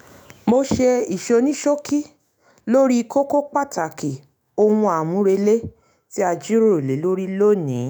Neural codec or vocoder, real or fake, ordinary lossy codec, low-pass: autoencoder, 48 kHz, 128 numbers a frame, DAC-VAE, trained on Japanese speech; fake; none; 19.8 kHz